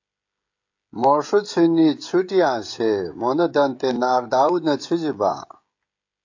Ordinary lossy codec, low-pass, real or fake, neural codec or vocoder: AAC, 48 kbps; 7.2 kHz; fake; codec, 16 kHz, 16 kbps, FreqCodec, smaller model